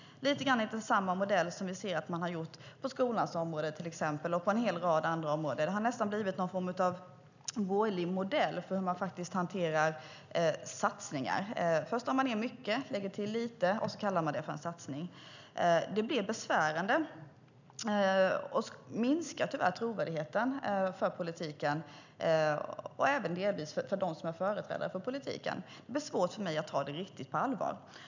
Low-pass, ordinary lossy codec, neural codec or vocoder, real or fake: 7.2 kHz; none; none; real